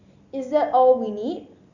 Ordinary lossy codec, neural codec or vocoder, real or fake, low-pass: Opus, 64 kbps; none; real; 7.2 kHz